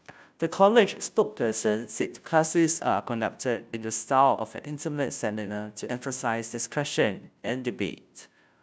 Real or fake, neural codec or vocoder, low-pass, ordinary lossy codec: fake; codec, 16 kHz, 0.5 kbps, FunCodec, trained on Chinese and English, 25 frames a second; none; none